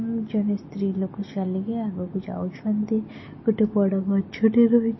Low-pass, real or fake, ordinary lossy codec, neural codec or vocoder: 7.2 kHz; real; MP3, 24 kbps; none